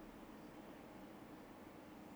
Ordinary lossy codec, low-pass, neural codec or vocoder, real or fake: none; none; none; real